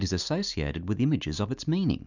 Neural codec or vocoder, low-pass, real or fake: none; 7.2 kHz; real